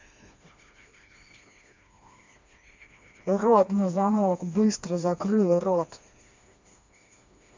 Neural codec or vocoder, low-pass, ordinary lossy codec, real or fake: codec, 16 kHz, 2 kbps, FreqCodec, smaller model; 7.2 kHz; MP3, 64 kbps; fake